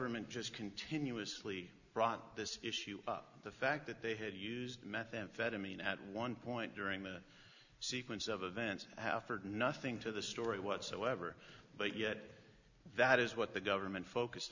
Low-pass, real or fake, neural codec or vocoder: 7.2 kHz; real; none